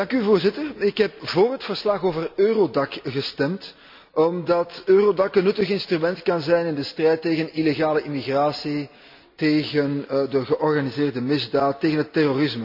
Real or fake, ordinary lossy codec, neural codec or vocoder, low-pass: real; MP3, 48 kbps; none; 5.4 kHz